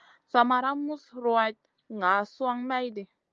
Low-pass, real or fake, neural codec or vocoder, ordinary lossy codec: 7.2 kHz; real; none; Opus, 24 kbps